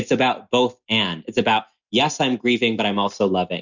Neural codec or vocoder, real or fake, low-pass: none; real; 7.2 kHz